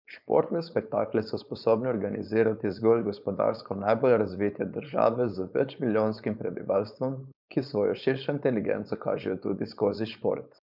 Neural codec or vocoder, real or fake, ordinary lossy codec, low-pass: codec, 16 kHz, 4.8 kbps, FACodec; fake; none; 5.4 kHz